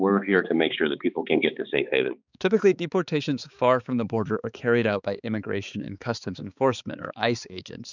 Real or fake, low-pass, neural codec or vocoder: fake; 7.2 kHz; codec, 16 kHz, 4 kbps, X-Codec, HuBERT features, trained on balanced general audio